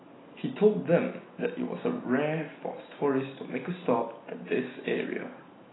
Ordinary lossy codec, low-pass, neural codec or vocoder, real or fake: AAC, 16 kbps; 7.2 kHz; none; real